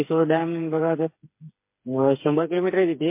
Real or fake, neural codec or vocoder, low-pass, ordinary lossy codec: fake; codec, 16 kHz, 4 kbps, FreqCodec, smaller model; 3.6 kHz; MP3, 24 kbps